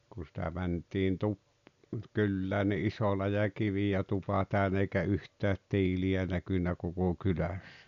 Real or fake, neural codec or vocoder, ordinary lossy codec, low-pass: real; none; none; 7.2 kHz